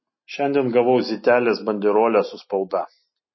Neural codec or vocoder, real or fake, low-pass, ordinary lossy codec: none; real; 7.2 kHz; MP3, 24 kbps